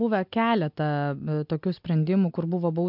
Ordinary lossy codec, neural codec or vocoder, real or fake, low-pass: MP3, 48 kbps; none; real; 5.4 kHz